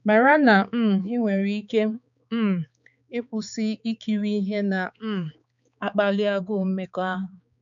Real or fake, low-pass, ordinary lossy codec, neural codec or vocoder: fake; 7.2 kHz; none; codec, 16 kHz, 4 kbps, X-Codec, HuBERT features, trained on balanced general audio